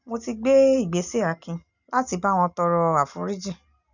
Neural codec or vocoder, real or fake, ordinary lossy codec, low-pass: none; real; none; 7.2 kHz